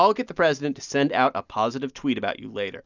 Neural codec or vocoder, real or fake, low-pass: none; real; 7.2 kHz